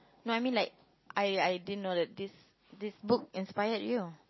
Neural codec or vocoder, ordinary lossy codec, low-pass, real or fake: none; MP3, 24 kbps; 7.2 kHz; real